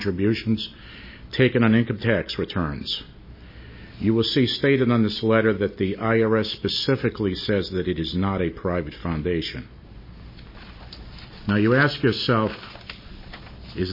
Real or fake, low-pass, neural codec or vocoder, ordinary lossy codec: real; 5.4 kHz; none; MP3, 24 kbps